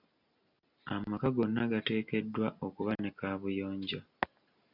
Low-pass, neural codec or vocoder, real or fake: 5.4 kHz; none; real